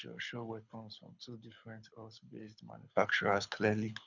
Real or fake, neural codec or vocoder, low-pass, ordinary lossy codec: fake; codec, 24 kHz, 6 kbps, HILCodec; 7.2 kHz; none